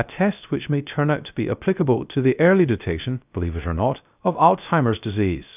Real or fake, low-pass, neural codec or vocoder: fake; 3.6 kHz; codec, 16 kHz, 0.3 kbps, FocalCodec